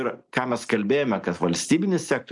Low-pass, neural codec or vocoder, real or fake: 10.8 kHz; none; real